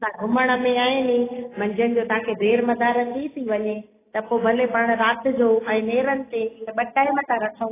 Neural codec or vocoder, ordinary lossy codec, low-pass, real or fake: none; AAC, 16 kbps; 3.6 kHz; real